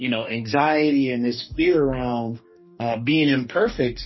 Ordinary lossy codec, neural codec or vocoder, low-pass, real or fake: MP3, 24 kbps; codec, 44.1 kHz, 2.6 kbps, DAC; 7.2 kHz; fake